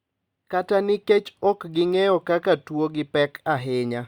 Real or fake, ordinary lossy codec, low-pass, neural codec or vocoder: real; Opus, 64 kbps; 19.8 kHz; none